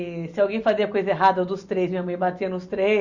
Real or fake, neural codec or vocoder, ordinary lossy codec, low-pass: real; none; Opus, 64 kbps; 7.2 kHz